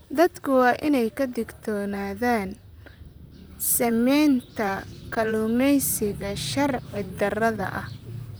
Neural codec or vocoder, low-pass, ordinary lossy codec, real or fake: vocoder, 44.1 kHz, 128 mel bands, Pupu-Vocoder; none; none; fake